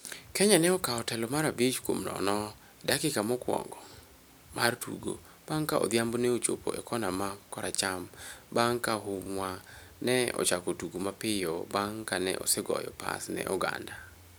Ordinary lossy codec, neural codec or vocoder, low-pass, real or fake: none; none; none; real